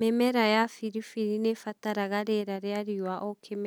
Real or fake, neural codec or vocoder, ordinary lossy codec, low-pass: real; none; none; none